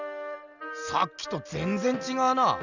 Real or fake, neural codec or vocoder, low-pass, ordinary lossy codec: real; none; 7.2 kHz; none